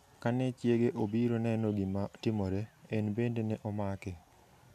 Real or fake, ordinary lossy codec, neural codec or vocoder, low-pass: real; none; none; 14.4 kHz